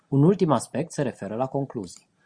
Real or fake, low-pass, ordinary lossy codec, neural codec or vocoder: real; 9.9 kHz; Opus, 64 kbps; none